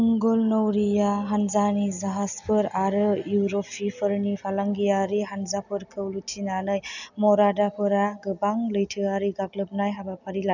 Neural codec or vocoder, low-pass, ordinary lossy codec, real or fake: none; 7.2 kHz; none; real